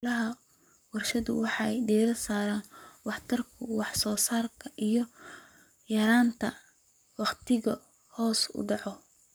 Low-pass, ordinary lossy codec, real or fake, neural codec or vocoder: none; none; fake; codec, 44.1 kHz, 7.8 kbps, Pupu-Codec